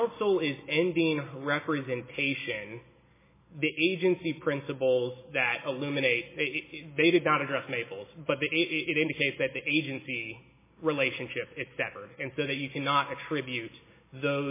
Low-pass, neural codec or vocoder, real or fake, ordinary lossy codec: 3.6 kHz; none; real; MP3, 16 kbps